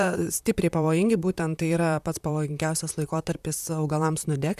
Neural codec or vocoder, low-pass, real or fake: vocoder, 44.1 kHz, 128 mel bands, Pupu-Vocoder; 14.4 kHz; fake